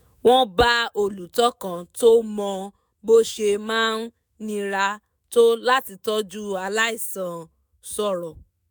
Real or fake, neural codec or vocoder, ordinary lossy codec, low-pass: fake; autoencoder, 48 kHz, 128 numbers a frame, DAC-VAE, trained on Japanese speech; none; none